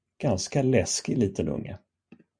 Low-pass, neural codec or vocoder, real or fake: 9.9 kHz; none; real